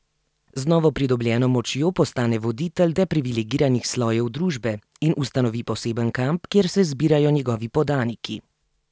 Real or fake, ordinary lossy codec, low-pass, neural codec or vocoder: real; none; none; none